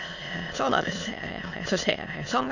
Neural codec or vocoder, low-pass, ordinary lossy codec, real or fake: autoencoder, 22.05 kHz, a latent of 192 numbers a frame, VITS, trained on many speakers; 7.2 kHz; none; fake